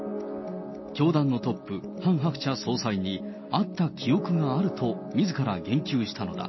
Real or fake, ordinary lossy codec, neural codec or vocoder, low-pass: real; MP3, 24 kbps; none; 7.2 kHz